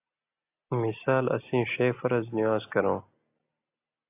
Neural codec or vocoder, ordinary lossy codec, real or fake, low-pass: none; AAC, 24 kbps; real; 3.6 kHz